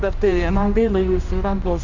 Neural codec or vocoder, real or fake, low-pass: codec, 16 kHz, 1 kbps, X-Codec, HuBERT features, trained on general audio; fake; 7.2 kHz